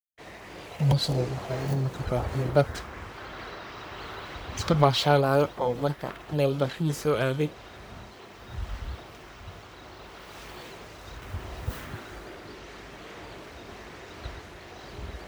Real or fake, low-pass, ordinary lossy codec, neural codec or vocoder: fake; none; none; codec, 44.1 kHz, 1.7 kbps, Pupu-Codec